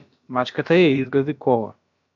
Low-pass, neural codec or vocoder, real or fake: 7.2 kHz; codec, 16 kHz, about 1 kbps, DyCAST, with the encoder's durations; fake